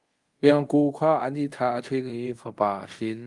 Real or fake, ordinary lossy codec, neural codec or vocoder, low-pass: fake; Opus, 24 kbps; codec, 24 kHz, 0.5 kbps, DualCodec; 10.8 kHz